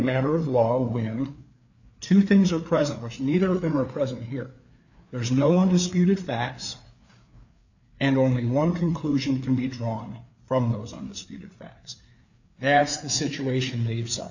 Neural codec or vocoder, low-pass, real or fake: codec, 16 kHz, 4 kbps, FreqCodec, larger model; 7.2 kHz; fake